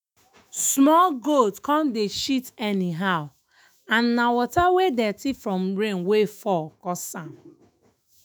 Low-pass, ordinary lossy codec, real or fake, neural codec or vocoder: none; none; fake; autoencoder, 48 kHz, 128 numbers a frame, DAC-VAE, trained on Japanese speech